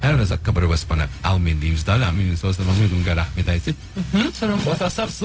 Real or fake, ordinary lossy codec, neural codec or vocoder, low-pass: fake; none; codec, 16 kHz, 0.4 kbps, LongCat-Audio-Codec; none